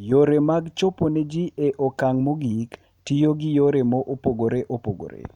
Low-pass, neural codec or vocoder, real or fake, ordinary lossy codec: 19.8 kHz; none; real; none